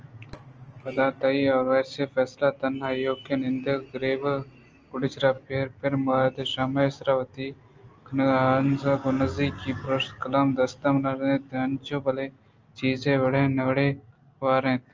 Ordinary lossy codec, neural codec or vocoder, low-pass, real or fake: Opus, 24 kbps; none; 7.2 kHz; real